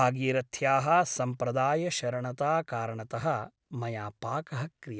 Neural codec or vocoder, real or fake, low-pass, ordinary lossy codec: none; real; none; none